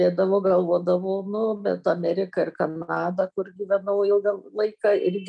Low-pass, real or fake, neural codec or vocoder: 9.9 kHz; real; none